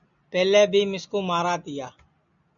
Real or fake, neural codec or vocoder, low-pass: real; none; 7.2 kHz